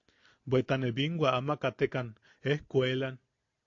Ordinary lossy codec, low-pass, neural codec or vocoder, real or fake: AAC, 48 kbps; 7.2 kHz; none; real